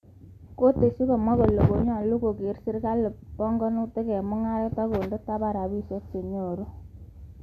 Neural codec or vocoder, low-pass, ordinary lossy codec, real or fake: none; 14.4 kHz; MP3, 96 kbps; real